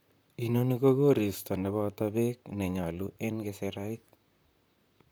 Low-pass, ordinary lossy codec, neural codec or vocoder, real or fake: none; none; vocoder, 44.1 kHz, 128 mel bands, Pupu-Vocoder; fake